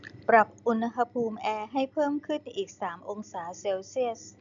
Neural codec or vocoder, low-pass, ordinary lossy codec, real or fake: none; 7.2 kHz; none; real